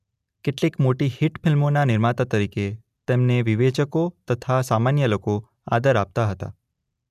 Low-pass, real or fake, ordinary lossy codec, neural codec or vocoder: 14.4 kHz; real; none; none